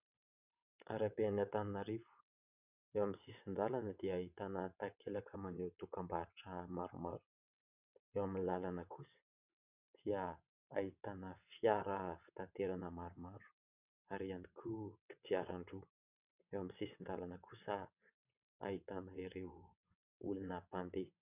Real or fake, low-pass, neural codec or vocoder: fake; 3.6 kHz; vocoder, 22.05 kHz, 80 mel bands, WaveNeXt